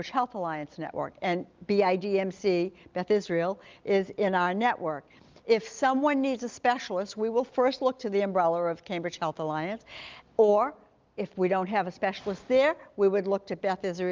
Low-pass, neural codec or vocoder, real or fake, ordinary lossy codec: 7.2 kHz; none; real; Opus, 32 kbps